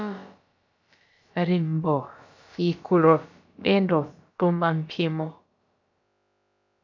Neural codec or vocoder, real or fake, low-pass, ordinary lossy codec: codec, 16 kHz, about 1 kbps, DyCAST, with the encoder's durations; fake; 7.2 kHz; MP3, 64 kbps